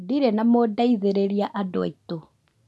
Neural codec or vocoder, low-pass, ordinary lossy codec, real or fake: none; none; none; real